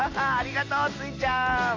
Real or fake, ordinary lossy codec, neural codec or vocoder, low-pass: real; AAC, 32 kbps; none; 7.2 kHz